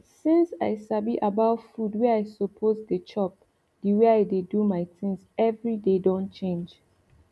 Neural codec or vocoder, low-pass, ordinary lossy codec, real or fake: none; none; none; real